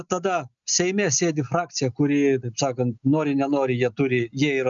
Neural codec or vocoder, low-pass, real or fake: none; 7.2 kHz; real